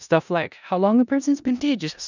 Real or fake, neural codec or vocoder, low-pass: fake; codec, 16 kHz in and 24 kHz out, 0.4 kbps, LongCat-Audio-Codec, four codebook decoder; 7.2 kHz